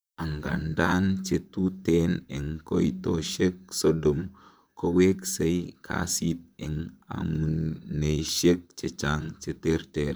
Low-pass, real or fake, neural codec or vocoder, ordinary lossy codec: none; fake; vocoder, 44.1 kHz, 128 mel bands, Pupu-Vocoder; none